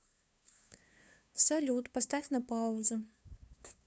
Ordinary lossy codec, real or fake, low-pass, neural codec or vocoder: none; fake; none; codec, 16 kHz, 2 kbps, FunCodec, trained on LibriTTS, 25 frames a second